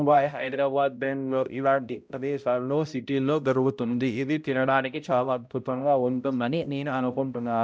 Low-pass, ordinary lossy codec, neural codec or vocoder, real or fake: none; none; codec, 16 kHz, 0.5 kbps, X-Codec, HuBERT features, trained on balanced general audio; fake